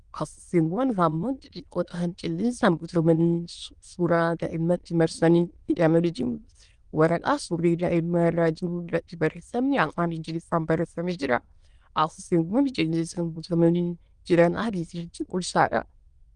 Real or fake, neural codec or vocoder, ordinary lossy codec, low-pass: fake; autoencoder, 22.05 kHz, a latent of 192 numbers a frame, VITS, trained on many speakers; Opus, 24 kbps; 9.9 kHz